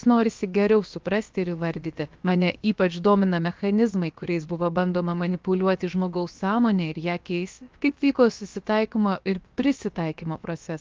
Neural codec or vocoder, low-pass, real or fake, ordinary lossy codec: codec, 16 kHz, about 1 kbps, DyCAST, with the encoder's durations; 7.2 kHz; fake; Opus, 24 kbps